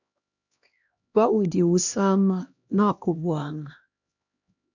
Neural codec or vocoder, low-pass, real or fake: codec, 16 kHz, 1 kbps, X-Codec, HuBERT features, trained on LibriSpeech; 7.2 kHz; fake